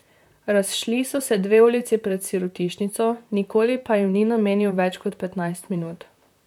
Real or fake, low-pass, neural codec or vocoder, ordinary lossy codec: fake; 19.8 kHz; vocoder, 44.1 kHz, 128 mel bands, Pupu-Vocoder; none